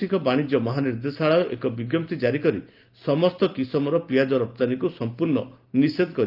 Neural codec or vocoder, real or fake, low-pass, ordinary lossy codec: none; real; 5.4 kHz; Opus, 32 kbps